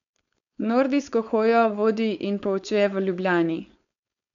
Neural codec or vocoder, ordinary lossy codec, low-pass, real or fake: codec, 16 kHz, 4.8 kbps, FACodec; none; 7.2 kHz; fake